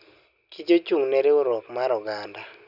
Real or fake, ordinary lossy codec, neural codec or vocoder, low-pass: real; none; none; 5.4 kHz